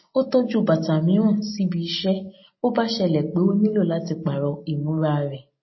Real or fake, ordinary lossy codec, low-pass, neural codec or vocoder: real; MP3, 24 kbps; 7.2 kHz; none